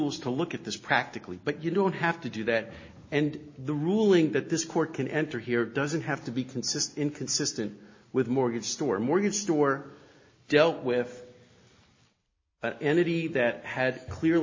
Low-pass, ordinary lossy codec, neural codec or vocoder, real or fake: 7.2 kHz; MP3, 32 kbps; none; real